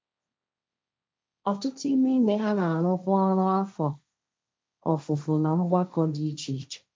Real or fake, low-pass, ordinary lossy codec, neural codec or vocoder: fake; none; none; codec, 16 kHz, 1.1 kbps, Voila-Tokenizer